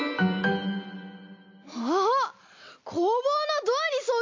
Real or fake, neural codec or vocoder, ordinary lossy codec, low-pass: real; none; MP3, 48 kbps; 7.2 kHz